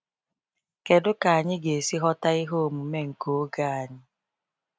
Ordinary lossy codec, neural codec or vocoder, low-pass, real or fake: none; none; none; real